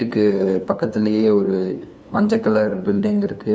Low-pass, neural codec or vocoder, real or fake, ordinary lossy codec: none; codec, 16 kHz, 2 kbps, FunCodec, trained on LibriTTS, 25 frames a second; fake; none